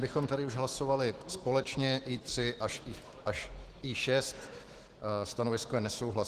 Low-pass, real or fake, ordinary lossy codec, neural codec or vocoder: 14.4 kHz; fake; Opus, 16 kbps; autoencoder, 48 kHz, 128 numbers a frame, DAC-VAE, trained on Japanese speech